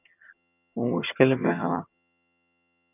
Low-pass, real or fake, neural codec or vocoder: 3.6 kHz; fake; vocoder, 22.05 kHz, 80 mel bands, HiFi-GAN